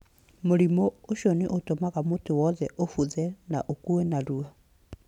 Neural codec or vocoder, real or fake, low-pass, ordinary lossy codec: none; real; 19.8 kHz; none